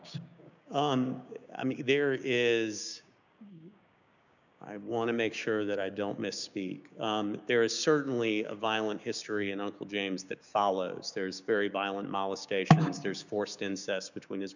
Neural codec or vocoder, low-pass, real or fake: codec, 16 kHz, 6 kbps, DAC; 7.2 kHz; fake